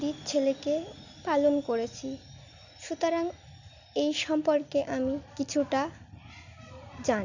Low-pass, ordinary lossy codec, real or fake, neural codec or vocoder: 7.2 kHz; none; real; none